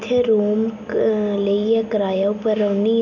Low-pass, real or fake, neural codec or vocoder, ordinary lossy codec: 7.2 kHz; real; none; none